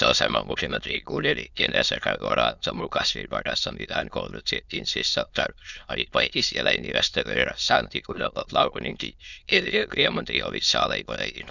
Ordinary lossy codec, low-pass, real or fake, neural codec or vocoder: none; 7.2 kHz; fake; autoencoder, 22.05 kHz, a latent of 192 numbers a frame, VITS, trained on many speakers